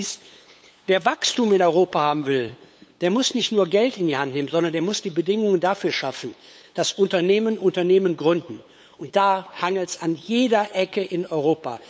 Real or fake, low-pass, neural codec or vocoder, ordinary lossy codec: fake; none; codec, 16 kHz, 8 kbps, FunCodec, trained on LibriTTS, 25 frames a second; none